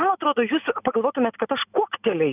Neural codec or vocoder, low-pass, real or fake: none; 3.6 kHz; real